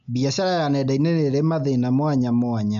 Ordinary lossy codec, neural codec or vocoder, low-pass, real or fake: MP3, 96 kbps; none; 7.2 kHz; real